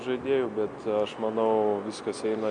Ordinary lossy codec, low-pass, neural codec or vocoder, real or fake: MP3, 64 kbps; 9.9 kHz; none; real